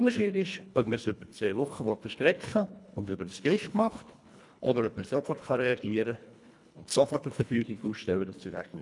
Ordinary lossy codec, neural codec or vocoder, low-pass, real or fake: none; codec, 24 kHz, 1.5 kbps, HILCodec; 10.8 kHz; fake